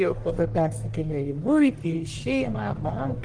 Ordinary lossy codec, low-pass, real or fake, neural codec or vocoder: Opus, 24 kbps; 9.9 kHz; fake; codec, 44.1 kHz, 1.7 kbps, Pupu-Codec